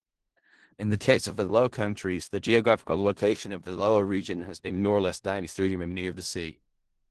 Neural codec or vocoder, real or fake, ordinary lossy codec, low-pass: codec, 16 kHz in and 24 kHz out, 0.4 kbps, LongCat-Audio-Codec, four codebook decoder; fake; Opus, 16 kbps; 10.8 kHz